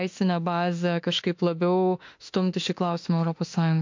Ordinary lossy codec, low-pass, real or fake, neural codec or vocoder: MP3, 48 kbps; 7.2 kHz; fake; autoencoder, 48 kHz, 32 numbers a frame, DAC-VAE, trained on Japanese speech